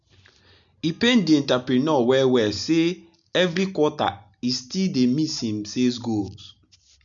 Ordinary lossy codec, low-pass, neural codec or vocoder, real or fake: none; 7.2 kHz; none; real